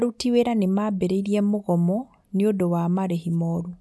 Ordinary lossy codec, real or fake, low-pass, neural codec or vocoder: none; real; none; none